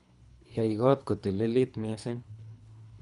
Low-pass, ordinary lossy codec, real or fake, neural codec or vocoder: 10.8 kHz; none; fake; codec, 24 kHz, 3 kbps, HILCodec